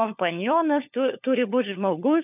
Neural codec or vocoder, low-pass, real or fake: codec, 16 kHz, 4 kbps, FunCodec, trained on LibriTTS, 50 frames a second; 3.6 kHz; fake